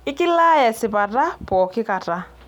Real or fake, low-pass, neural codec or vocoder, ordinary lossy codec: real; 19.8 kHz; none; none